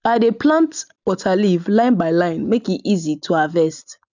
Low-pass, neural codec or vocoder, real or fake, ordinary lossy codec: 7.2 kHz; none; real; none